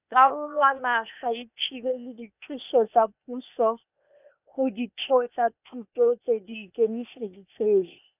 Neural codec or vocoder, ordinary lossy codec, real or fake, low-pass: codec, 16 kHz, 0.8 kbps, ZipCodec; none; fake; 3.6 kHz